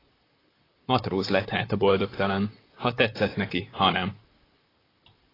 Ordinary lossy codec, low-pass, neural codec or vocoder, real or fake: AAC, 24 kbps; 5.4 kHz; vocoder, 44.1 kHz, 128 mel bands, Pupu-Vocoder; fake